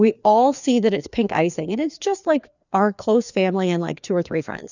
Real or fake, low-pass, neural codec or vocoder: fake; 7.2 kHz; codec, 16 kHz, 2 kbps, FreqCodec, larger model